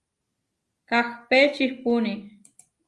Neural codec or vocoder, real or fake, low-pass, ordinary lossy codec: none; real; 10.8 kHz; Opus, 32 kbps